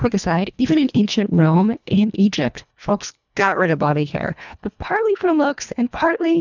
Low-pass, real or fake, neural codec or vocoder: 7.2 kHz; fake; codec, 24 kHz, 1.5 kbps, HILCodec